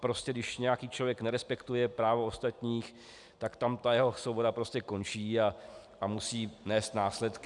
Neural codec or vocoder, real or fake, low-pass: none; real; 10.8 kHz